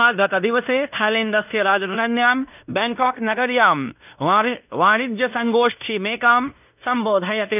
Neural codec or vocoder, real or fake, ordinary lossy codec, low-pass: codec, 16 kHz in and 24 kHz out, 0.9 kbps, LongCat-Audio-Codec, fine tuned four codebook decoder; fake; none; 3.6 kHz